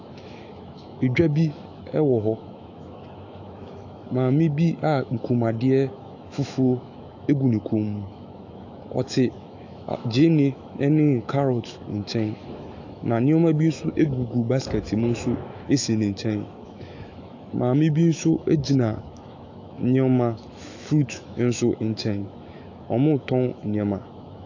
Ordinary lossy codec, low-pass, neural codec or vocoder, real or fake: AAC, 48 kbps; 7.2 kHz; autoencoder, 48 kHz, 128 numbers a frame, DAC-VAE, trained on Japanese speech; fake